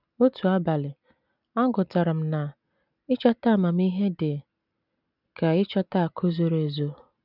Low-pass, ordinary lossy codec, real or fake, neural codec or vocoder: 5.4 kHz; none; real; none